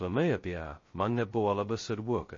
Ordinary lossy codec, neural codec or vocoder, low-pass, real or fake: MP3, 32 kbps; codec, 16 kHz, 0.2 kbps, FocalCodec; 7.2 kHz; fake